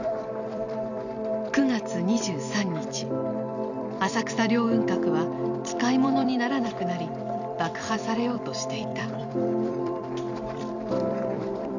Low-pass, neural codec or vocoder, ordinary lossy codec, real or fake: 7.2 kHz; vocoder, 44.1 kHz, 128 mel bands every 256 samples, BigVGAN v2; none; fake